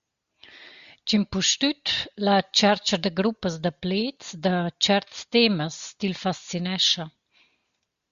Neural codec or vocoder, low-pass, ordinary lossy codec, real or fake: none; 7.2 kHz; Opus, 64 kbps; real